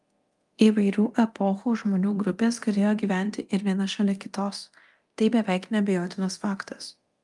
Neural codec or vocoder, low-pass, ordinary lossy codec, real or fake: codec, 24 kHz, 0.9 kbps, DualCodec; 10.8 kHz; Opus, 32 kbps; fake